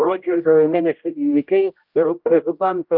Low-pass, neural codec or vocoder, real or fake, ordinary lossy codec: 5.4 kHz; codec, 16 kHz, 0.5 kbps, X-Codec, HuBERT features, trained on general audio; fake; Opus, 32 kbps